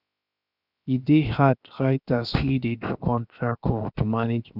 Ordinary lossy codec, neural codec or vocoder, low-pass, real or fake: none; codec, 16 kHz, 0.7 kbps, FocalCodec; 5.4 kHz; fake